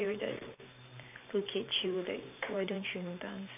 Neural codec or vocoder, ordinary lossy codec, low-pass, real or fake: vocoder, 44.1 kHz, 128 mel bands every 512 samples, BigVGAN v2; none; 3.6 kHz; fake